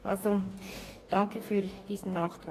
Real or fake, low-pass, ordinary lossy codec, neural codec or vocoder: fake; 14.4 kHz; AAC, 48 kbps; codec, 44.1 kHz, 2.6 kbps, DAC